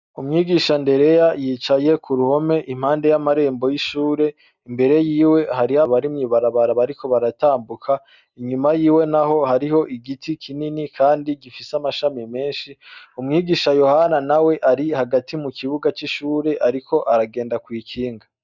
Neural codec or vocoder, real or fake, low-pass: none; real; 7.2 kHz